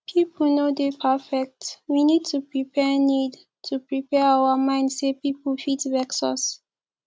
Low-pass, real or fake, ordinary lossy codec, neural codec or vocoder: none; real; none; none